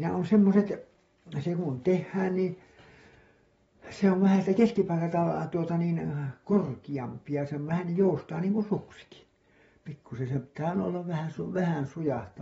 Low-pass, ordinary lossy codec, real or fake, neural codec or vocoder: 19.8 kHz; AAC, 24 kbps; real; none